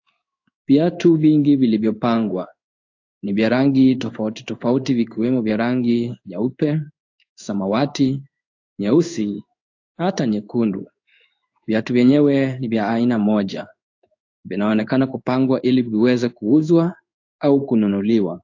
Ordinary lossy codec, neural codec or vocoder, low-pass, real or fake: AAC, 48 kbps; codec, 16 kHz in and 24 kHz out, 1 kbps, XY-Tokenizer; 7.2 kHz; fake